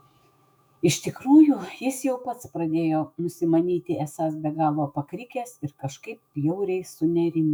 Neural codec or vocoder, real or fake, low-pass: autoencoder, 48 kHz, 128 numbers a frame, DAC-VAE, trained on Japanese speech; fake; 19.8 kHz